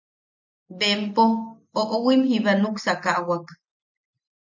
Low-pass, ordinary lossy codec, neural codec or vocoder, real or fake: 7.2 kHz; MP3, 64 kbps; none; real